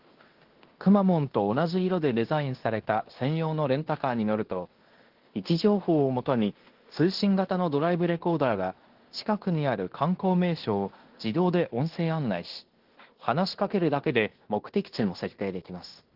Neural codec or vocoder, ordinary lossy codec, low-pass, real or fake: codec, 16 kHz in and 24 kHz out, 0.9 kbps, LongCat-Audio-Codec, fine tuned four codebook decoder; Opus, 16 kbps; 5.4 kHz; fake